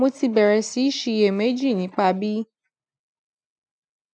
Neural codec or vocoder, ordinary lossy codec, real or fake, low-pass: none; none; real; 9.9 kHz